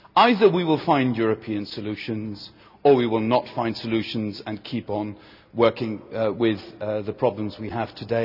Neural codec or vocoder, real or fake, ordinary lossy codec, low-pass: none; real; none; 5.4 kHz